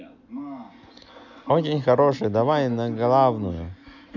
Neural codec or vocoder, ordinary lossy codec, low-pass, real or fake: none; none; 7.2 kHz; real